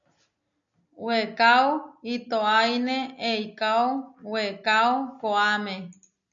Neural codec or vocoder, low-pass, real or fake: none; 7.2 kHz; real